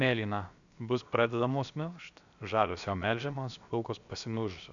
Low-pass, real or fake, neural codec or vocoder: 7.2 kHz; fake; codec, 16 kHz, about 1 kbps, DyCAST, with the encoder's durations